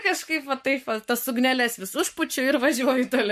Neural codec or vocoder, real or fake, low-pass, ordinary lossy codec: codec, 44.1 kHz, 7.8 kbps, Pupu-Codec; fake; 14.4 kHz; MP3, 64 kbps